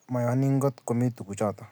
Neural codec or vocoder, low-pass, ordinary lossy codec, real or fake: none; none; none; real